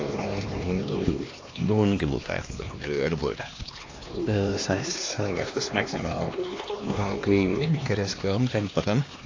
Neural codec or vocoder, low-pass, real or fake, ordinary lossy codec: codec, 16 kHz, 2 kbps, X-Codec, HuBERT features, trained on LibriSpeech; 7.2 kHz; fake; AAC, 32 kbps